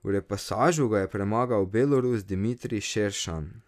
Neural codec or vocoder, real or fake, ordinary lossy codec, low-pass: none; real; none; 14.4 kHz